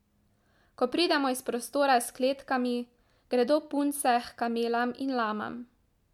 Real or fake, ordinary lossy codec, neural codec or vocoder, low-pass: real; MP3, 96 kbps; none; 19.8 kHz